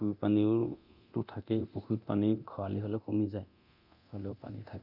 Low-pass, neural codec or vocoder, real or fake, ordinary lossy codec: 5.4 kHz; codec, 24 kHz, 0.9 kbps, DualCodec; fake; none